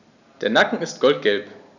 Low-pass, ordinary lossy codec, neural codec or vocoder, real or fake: 7.2 kHz; none; none; real